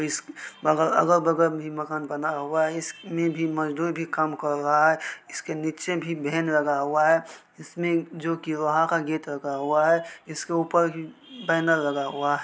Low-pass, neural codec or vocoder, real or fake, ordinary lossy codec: none; none; real; none